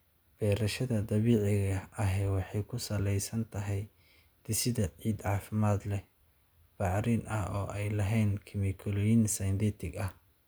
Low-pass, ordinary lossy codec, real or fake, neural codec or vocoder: none; none; real; none